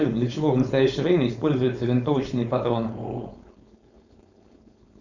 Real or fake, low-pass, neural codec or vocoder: fake; 7.2 kHz; codec, 16 kHz, 4.8 kbps, FACodec